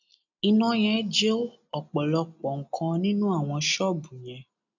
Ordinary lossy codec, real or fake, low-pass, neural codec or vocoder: none; real; 7.2 kHz; none